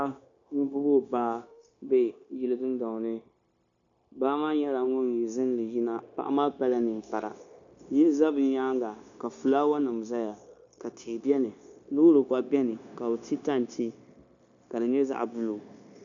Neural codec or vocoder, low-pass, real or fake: codec, 16 kHz, 0.9 kbps, LongCat-Audio-Codec; 7.2 kHz; fake